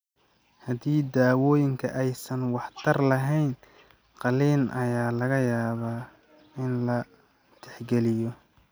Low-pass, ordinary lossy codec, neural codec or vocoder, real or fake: none; none; none; real